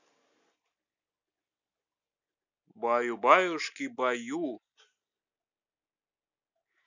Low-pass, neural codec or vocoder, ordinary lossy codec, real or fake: 7.2 kHz; none; none; real